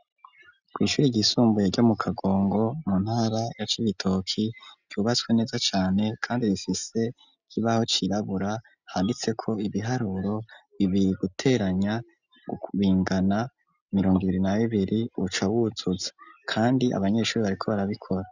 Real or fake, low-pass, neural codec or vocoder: real; 7.2 kHz; none